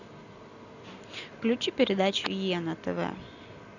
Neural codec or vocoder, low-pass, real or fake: none; 7.2 kHz; real